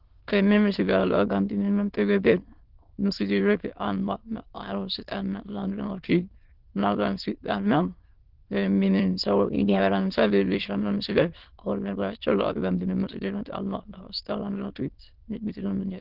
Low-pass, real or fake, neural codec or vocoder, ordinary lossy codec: 5.4 kHz; fake; autoencoder, 22.05 kHz, a latent of 192 numbers a frame, VITS, trained on many speakers; Opus, 16 kbps